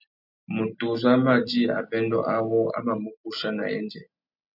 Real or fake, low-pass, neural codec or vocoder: real; 5.4 kHz; none